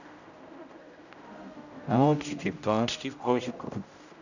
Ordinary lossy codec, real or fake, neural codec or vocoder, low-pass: none; fake; codec, 16 kHz, 0.5 kbps, X-Codec, HuBERT features, trained on general audio; 7.2 kHz